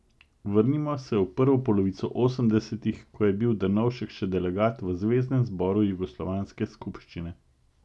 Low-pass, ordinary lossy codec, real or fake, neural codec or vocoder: none; none; real; none